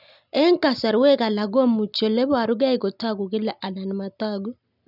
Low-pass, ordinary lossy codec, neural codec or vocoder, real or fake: 5.4 kHz; none; none; real